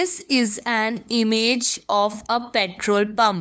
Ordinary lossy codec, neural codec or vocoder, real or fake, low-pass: none; codec, 16 kHz, 2 kbps, FunCodec, trained on LibriTTS, 25 frames a second; fake; none